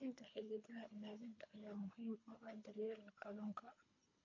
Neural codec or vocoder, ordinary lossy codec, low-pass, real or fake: codec, 24 kHz, 3 kbps, HILCodec; MP3, 48 kbps; 7.2 kHz; fake